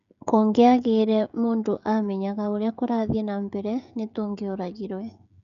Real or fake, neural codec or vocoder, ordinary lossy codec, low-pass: fake; codec, 16 kHz, 16 kbps, FreqCodec, smaller model; none; 7.2 kHz